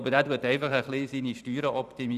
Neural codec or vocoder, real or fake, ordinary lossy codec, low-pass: vocoder, 44.1 kHz, 128 mel bands every 512 samples, BigVGAN v2; fake; none; 14.4 kHz